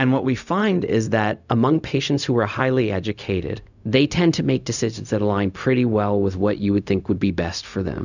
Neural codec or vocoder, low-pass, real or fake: codec, 16 kHz, 0.4 kbps, LongCat-Audio-Codec; 7.2 kHz; fake